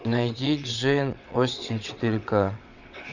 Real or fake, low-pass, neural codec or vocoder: fake; 7.2 kHz; vocoder, 22.05 kHz, 80 mel bands, WaveNeXt